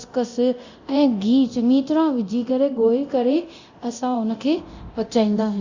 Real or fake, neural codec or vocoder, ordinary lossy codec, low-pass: fake; codec, 24 kHz, 0.9 kbps, DualCodec; Opus, 64 kbps; 7.2 kHz